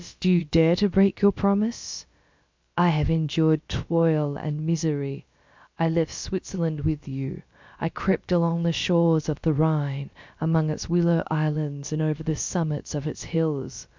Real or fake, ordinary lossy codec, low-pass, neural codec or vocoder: fake; MP3, 64 kbps; 7.2 kHz; codec, 16 kHz, about 1 kbps, DyCAST, with the encoder's durations